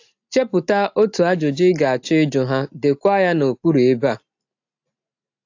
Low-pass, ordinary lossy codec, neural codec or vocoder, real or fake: 7.2 kHz; AAC, 48 kbps; none; real